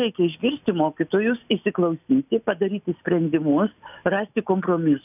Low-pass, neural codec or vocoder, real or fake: 3.6 kHz; none; real